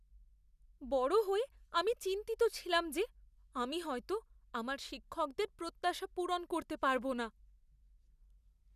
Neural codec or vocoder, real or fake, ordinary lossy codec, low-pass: none; real; none; 14.4 kHz